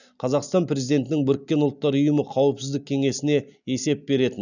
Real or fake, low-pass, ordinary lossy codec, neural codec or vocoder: real; 7.2 kHz; none; none